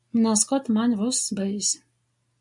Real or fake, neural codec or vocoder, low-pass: real; none; 10.8 kHz